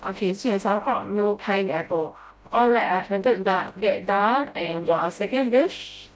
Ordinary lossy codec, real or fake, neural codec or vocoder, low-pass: none; fake; codec, 16 kHz, 0.5 kbps, FreqCodec, smaller model; none